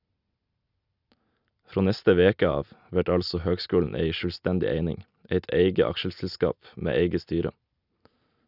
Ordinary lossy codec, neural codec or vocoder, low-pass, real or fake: none; none; 5.4 kHz; real